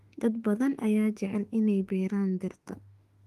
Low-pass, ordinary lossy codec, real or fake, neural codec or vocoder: 14.4 kHz; Opus, 24 kbps; fake; autoencoder, 48 kHz, 32 numbers a frame, DAC-VAE, trained on Japanese speech